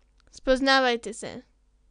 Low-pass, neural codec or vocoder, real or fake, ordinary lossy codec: 9.9 kHz; none; real; none